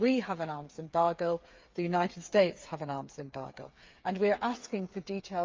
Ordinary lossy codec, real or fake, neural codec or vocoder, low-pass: Opus, 24 kbps; fake; codec, 16 kHz, 8 kbps, FreqCodec, smaller model; 7.2 kHz